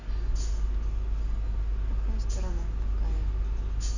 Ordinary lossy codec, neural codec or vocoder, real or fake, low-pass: none; none; real; 7.2 kHz